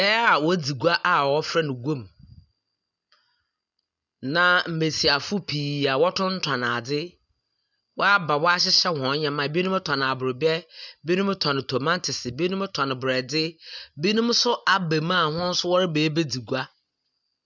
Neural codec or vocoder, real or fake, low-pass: none; real; 7.2 kHz